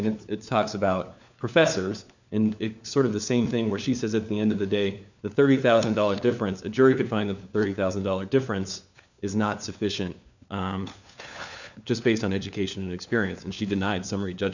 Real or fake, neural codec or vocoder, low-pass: fake; codec, 16 kHz, 4 kbps, FunCodec, trained on LibriTTS, 50 frames a second; 7.2 kHz